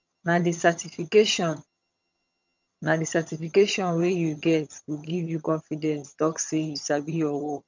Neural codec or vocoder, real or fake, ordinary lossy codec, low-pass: vocoder, 22.05 kHz, 80 mel bands, HiFi-GAN; fake; none; 7.2 kHz